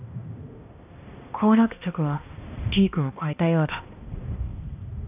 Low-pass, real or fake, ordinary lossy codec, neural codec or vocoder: 3.6 kHz; fake; none; codec, 16 kHz, 1 kbps, X-Codec, HuBERT features, trained on balanced general audio